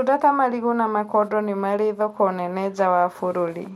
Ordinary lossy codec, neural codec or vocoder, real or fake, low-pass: MP3, 64 kbps; none; real; 19.8 kHz